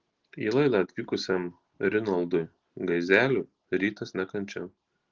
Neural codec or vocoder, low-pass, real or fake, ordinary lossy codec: none; 7.2 kHz; real; Opus, 16 kbps